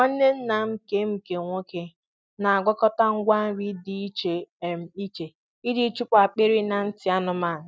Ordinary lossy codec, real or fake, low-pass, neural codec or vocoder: none; real; none; none